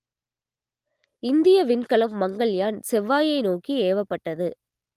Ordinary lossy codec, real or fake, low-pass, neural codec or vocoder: Opus, 24 kbps; real; 14.4 kHz; none